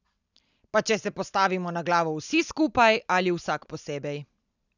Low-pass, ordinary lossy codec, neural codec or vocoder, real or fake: 7.2 kHz; none; none; real